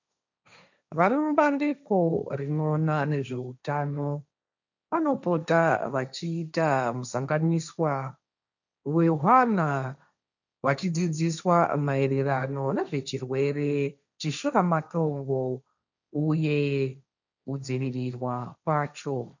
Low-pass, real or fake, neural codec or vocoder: 7.2 kHz; fake; codec, 16 kHz, 1.1 kbps, Voila-Tokenizer